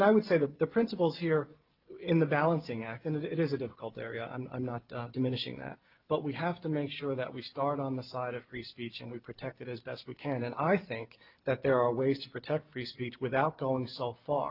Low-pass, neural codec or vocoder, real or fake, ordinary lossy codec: 5.4 kHz; none; real; Opus, 24 kbps